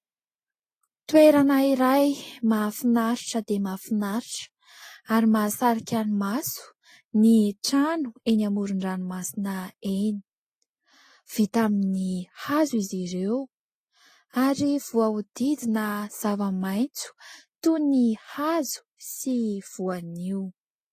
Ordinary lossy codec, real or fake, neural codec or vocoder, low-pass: AAC, 48 kbps; real; none; 14.4 kHz